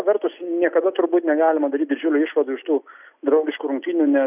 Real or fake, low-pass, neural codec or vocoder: real; 3.6 kHz; none